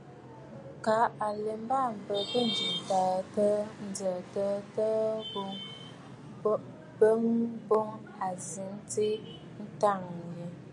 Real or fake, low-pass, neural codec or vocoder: real; 10.8 kHz; none